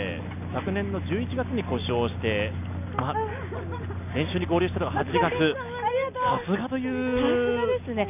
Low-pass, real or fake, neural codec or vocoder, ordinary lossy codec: 3.6 kHz; real; none; none